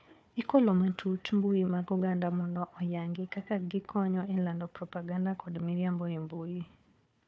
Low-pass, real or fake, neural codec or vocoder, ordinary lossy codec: none; fake; codec, 16 kHz, 4 kbps, FunCodec, trained on Chinese and English, 50 frames a second; none